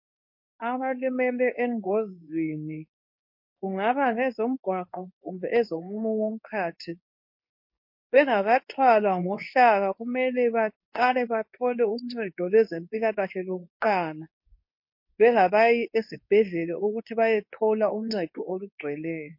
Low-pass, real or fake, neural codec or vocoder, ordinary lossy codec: 5.4 kHz; fake; codec, 24 kHz, 0.9 kbps, WavTokenizer, medium speech release version 2; MP3, 32 kbps